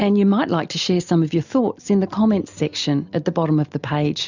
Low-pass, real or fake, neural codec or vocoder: 7.2 kHz; real; none